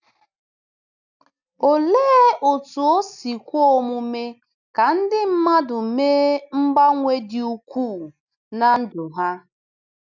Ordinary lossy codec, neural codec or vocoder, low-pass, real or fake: none; none; 7.2 kHz; real